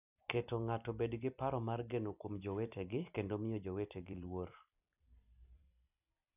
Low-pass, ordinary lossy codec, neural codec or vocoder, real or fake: 3.6 kHz; none; vocoder, 44.1 kHz, 128 mel bands every 256 samples, BigVGAN v2; fake